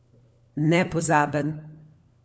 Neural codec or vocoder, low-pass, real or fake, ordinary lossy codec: codec, 16 kHz, 4 kbps, FunCodec, trained on LibriTTS, 50 frames a second; none; fake; none